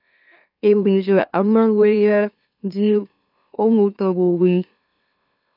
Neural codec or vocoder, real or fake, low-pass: autoencoder, 44.1 kHz, a latent of 192 numbers a frame, MeloTTS; fake; 5.4 kHz